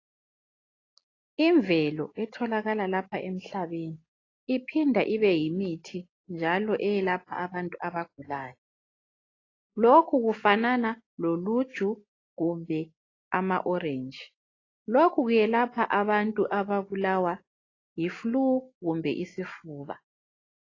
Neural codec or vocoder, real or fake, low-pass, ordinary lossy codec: none; real; 7.2 kHz; AAC, 32 kbps